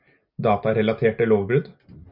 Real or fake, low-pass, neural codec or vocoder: real; 5.4 kHz; none